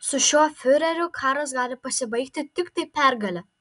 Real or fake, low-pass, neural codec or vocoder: real; 10.8 kHz; none